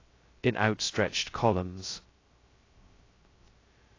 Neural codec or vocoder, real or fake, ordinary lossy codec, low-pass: codec, 16 kHz, 0.2 kbps, FocalCodec; fake; AAC, 32 kbps; 7.2 kHz